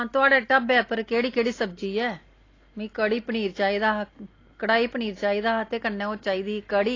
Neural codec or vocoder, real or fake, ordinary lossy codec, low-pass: none; real; AAC, 32 kbps; 7.2 kHz